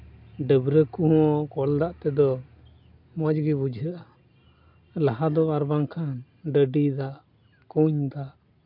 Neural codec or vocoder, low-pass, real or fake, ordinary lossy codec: none; 5.4 kHz; real; MP3, 48 kbps